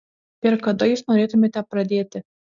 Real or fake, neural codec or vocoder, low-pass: real; none; 7.2 kHz